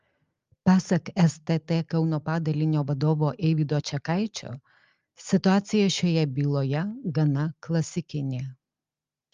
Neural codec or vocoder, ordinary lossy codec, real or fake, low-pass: none; Opus, 32 kbps; real; 7.2 kHz